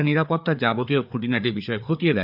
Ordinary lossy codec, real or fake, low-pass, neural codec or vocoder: none; fake; 5.4 kHz; codec, 16 kHz, 4 kbps, FreqCodec, larger model